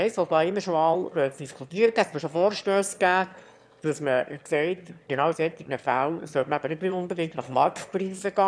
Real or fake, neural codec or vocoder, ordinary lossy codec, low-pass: fake; autoencoder, 22.05 kHz, a latent of 192 numbers a frame, VITS, trained on one speaker; none; none